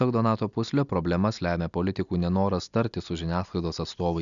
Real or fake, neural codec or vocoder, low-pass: real; none; 7.2 kHz